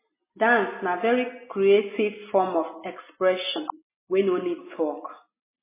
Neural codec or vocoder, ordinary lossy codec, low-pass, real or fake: none; MP3, 16 kbps; 3.6 kHz; real